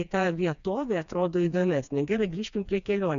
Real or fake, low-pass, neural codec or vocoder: fake; 7.2 kHz; codec, 16 kHz, 2 kbps, FreqCodec, smaller model